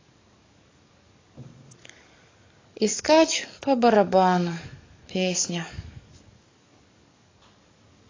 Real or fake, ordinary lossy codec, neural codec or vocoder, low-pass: fake; AAC, 32 kbps; codec, 44.1 kHz, 7.8 kbps, DAC; 7.2 kHz